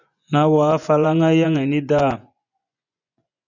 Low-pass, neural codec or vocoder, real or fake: 7.2 kHz; vocoder, 24 kHz, 100 mel bands, Vocos; fake